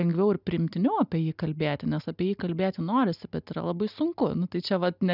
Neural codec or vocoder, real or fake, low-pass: none; real; 5.4 kHz